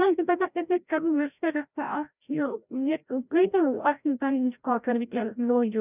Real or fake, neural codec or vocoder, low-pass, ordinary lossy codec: fake; codec, 16 kHz, 0.5 kbps, FreqCodec, larger model; 3.6 kHz; none